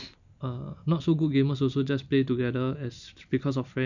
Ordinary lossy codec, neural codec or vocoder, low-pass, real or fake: none; none; 7.2 kHz; real